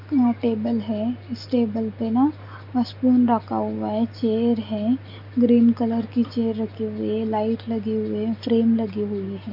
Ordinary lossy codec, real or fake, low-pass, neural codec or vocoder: none; real; 5.4 kHz; none